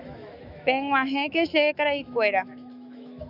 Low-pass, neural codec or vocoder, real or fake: 5.4 kHz; autoencoder, 48 kHz, 128 numbers a frame, DAC-VAE, trained on Japanese speech; fake